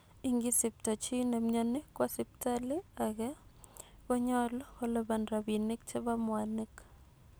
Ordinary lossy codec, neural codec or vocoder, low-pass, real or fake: none; none; none; real